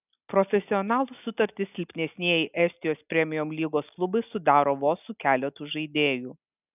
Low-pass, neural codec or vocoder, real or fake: 3.6 kHz; none; real